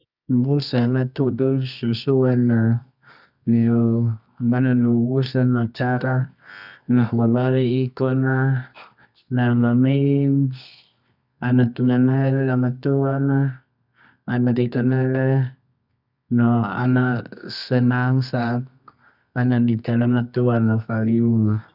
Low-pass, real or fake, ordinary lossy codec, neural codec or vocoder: 5.4 kHz; fake; none; codec, 24 kHz, 0.9 kbps, WavTokenizer, medium music audio release